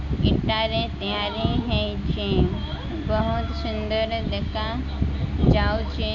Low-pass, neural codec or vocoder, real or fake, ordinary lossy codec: 7.2 kHz; none; real; MP3, 64 kbps